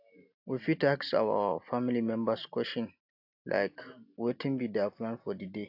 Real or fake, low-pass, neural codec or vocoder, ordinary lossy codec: real; 5.4 kHz; none; AAC, 48 kbps